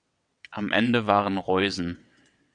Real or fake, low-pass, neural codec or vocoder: fake; 9.9 kHz; vocoder, 22.05 kHz, 80 mel bands, WaveNeXt